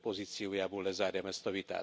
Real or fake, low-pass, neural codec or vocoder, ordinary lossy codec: real; none; none; none